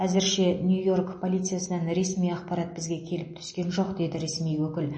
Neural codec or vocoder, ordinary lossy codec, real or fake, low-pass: none; MP3, 32 kbps; real; 9.9 kHz